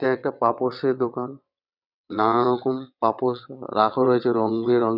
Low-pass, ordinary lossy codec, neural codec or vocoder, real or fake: 5.4 kHz; none; vocoder, 22.05 kHz, 80 mel bands, WaveNeXt; fake